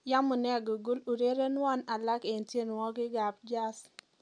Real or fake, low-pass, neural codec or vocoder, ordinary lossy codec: real; 9.9 kHz; none; none